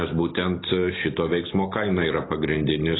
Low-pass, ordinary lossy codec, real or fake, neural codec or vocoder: 7.2 kHz; AAC, 16 kbps; real; none